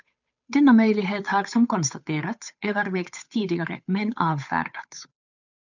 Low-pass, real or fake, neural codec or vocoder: 7.2 kHz; fake; codec, 16 kHz, 8 kbps, FunCodec, trained on Chinese and English, 25 frames a second